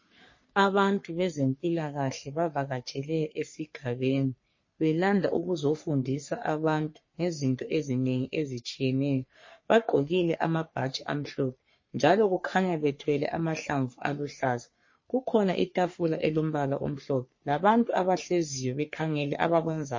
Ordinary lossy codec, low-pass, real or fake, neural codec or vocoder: MP3, 32 kbps; 7.2 kHz; fake; codec, 44.1 kHz, 3.4 kbps, Pupu-Codec